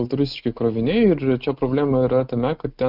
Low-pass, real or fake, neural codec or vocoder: 5.4 kHz; real; none